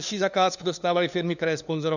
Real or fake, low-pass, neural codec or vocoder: fake; 7.2 kHz; codec, 16 kHz, 2 kbps, FunCodec, trained on LibriTTS, 25 frames a second